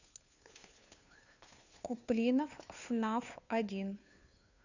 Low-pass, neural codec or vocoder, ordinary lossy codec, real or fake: 7.2 kHz; codec, 16 kHz, 2 kbps, FunCodec, trained on Chinese and English, 25 frames a second; none; fake